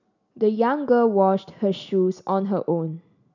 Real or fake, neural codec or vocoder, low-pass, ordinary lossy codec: real; none; 7.2 kHz; none